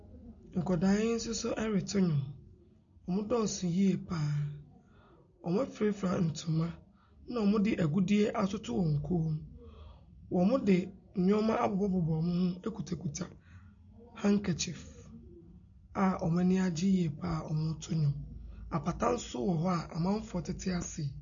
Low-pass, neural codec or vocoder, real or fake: 7.2 kHz; none; real